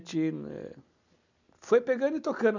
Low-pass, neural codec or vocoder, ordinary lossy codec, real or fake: 7.2 kHz; none; none; real